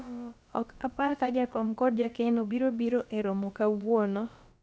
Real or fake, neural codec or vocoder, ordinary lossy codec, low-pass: fake; codec, 16 kHz, about 1 kbps, DyCAST, with the encoder's durations; none; none